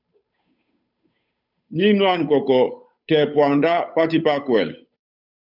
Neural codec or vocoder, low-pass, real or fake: codec, 16 kHz, 8 kbps, FunCodec, trained on Chinese and English, 25 frames a second; 5.4 kHz; fake